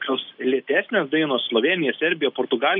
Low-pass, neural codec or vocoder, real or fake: 5.4 kHz; none; real